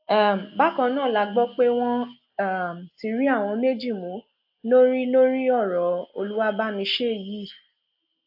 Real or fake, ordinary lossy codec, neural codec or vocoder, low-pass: real; AAC, 48 kbps; none; 5.4 kHz